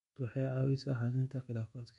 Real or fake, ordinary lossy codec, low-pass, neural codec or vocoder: fake; MP3, 64 kbps; 10.8 kHz; codec, 24 kHz, 1.2 kbps, DualCodec